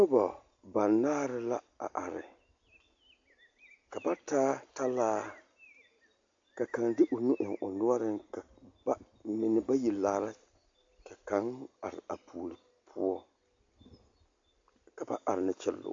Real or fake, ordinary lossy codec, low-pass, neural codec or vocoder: real; AAC, 48 kbps; 7.2 kHz; none